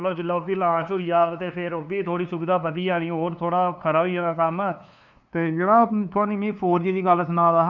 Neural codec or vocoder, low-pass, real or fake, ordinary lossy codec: codec, 16 kHz, 2 kbps, FunCodec, trained on LibriTTS, 25 frames a second; 7.2 kHz; fake; none